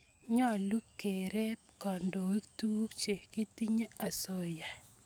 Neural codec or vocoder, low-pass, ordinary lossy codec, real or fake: codec, 44.1 kHz, 7.8 kbps, DAC; none; none; fake